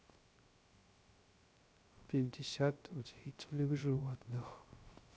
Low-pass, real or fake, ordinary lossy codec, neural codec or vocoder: none; fake; none; codec, 16 kHz, 0.3 kbps, FocalCodec